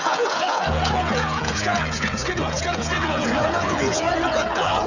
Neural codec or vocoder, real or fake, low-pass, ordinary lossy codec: codec, 16 kHz, 16 kbps, FreqCodec, smaller model; fake; 7.2 kHz; none